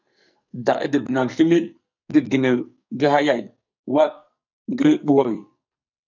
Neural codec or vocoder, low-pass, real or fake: codec, 44.1 kHz, 2.6 kbps, SNAC; 7.2 kHz; fake